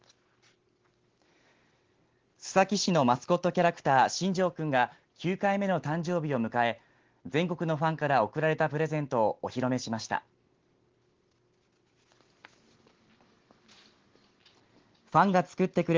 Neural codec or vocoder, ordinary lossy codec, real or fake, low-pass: none; Opus, 16 kbps; real; 7.2 kHz